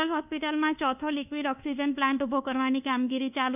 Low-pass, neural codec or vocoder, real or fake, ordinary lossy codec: 3.6 kHz; codec, 24 kHz, 1.2 kbps, DualCodec; fake; none